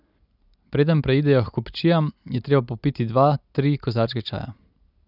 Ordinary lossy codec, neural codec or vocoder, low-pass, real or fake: none; none; 5.4 kHz; real